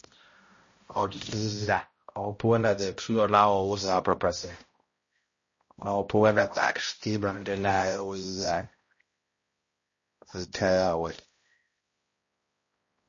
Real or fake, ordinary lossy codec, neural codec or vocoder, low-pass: fake; MP3, 32 kbps; codec, 16 kHz, 0.5 kbps, X-Codec, HuBERT features, trained on balanced general audio; 7.2 kHz